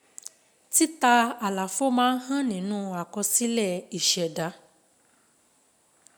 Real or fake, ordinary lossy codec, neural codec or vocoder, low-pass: real; none; none; none